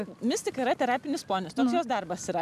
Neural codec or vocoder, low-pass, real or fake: none; 14.4 kHz; real